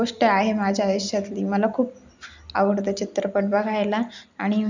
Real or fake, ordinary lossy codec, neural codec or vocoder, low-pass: real; none; none; 7.2 kHz